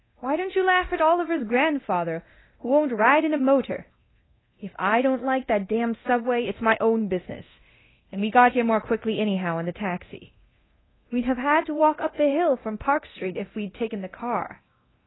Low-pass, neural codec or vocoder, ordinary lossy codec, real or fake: 7.2 kHz; codec, 24 kHz, 0.9 kbps, DualCodec; AAC, 16 kbps; fake